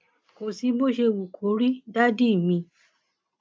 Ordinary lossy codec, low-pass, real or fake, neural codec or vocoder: none; none; real; none